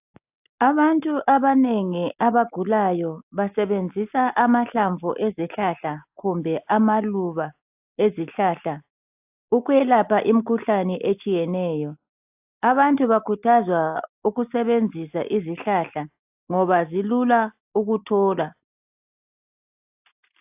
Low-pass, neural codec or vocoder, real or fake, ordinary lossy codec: 3.6 kHz; none; real; AAC, 32 kbps